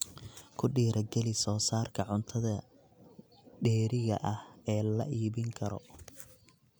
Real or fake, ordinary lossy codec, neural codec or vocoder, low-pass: real; none; none; none